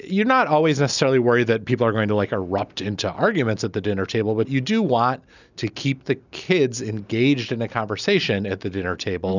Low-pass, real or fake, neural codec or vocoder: 7.2 kHz; real; none